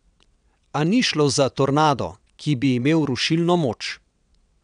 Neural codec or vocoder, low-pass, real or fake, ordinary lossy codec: vocoder, 22.05 kHz, 80 mel bands, WaveNeXt; 9.9 kHz; fake; none